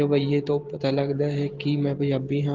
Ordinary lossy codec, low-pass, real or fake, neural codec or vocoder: Opus, 16 kbps; 7.2 kHz; real; none